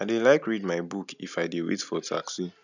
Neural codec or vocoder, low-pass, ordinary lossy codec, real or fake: none; 7.2 kHz; none; real